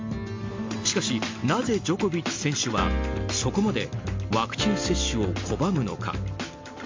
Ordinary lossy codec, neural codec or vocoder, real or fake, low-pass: MP3, 48 kbps; none; real; 7.2 kHz